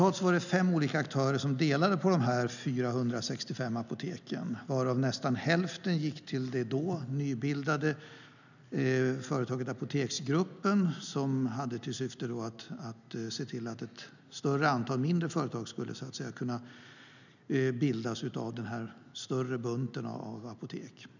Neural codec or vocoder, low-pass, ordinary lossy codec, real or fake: none; 7.2 kHz; none; real